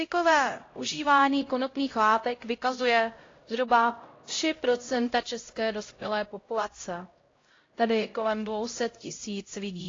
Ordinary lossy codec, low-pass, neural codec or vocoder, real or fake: AAC, 32 kbps; 7.2 kHz; codec, 16 kHz, 0.5 kbps, X-Codec, HuBERT features, trained on LibriSpeech; fake